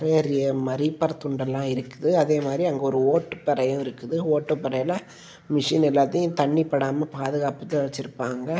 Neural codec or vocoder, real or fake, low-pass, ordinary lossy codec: none; real; none; none